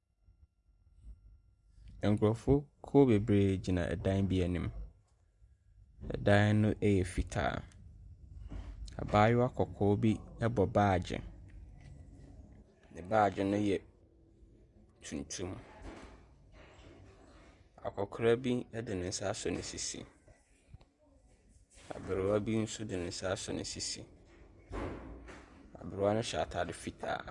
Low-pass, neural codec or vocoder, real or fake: 10.8 kHz; none; real